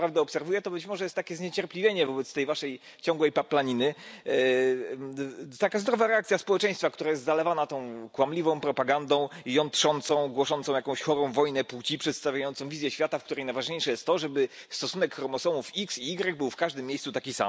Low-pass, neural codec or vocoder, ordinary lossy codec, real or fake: none; none; none; real